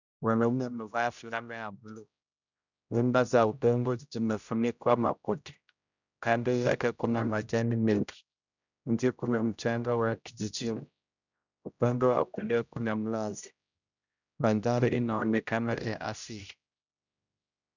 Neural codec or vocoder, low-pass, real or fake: codec, 16 kHz, 0.5 kbps, X-Codec, HuBERT features, trained on general audio; 7.2 kHz; fake